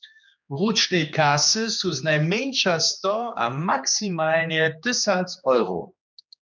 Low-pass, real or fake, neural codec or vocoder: 7.2 kHz; fake; codec, 16 kHz, 2 kbps, X-Codec, HuBERT features, trained on general audio